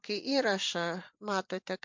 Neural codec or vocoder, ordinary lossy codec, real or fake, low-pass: vocoder, 44.1 kHz, 128 mel bands every 512 samples, BigVGAN v2; MP3, 64 kbps; fake; 7.2 kHz